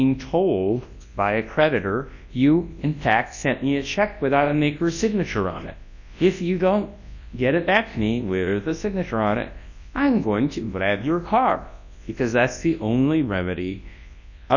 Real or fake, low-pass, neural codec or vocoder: fake; 7.2 kHz; codec, 24 kHz, 0.9 kbps, WavTokenizer, large speech release